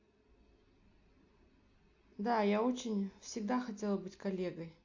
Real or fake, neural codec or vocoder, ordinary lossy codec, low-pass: real; none; AAC, 48 kbps; 7.2 kHz